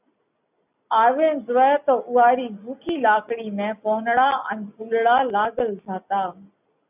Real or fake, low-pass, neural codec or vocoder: real; 3.6 kHz; none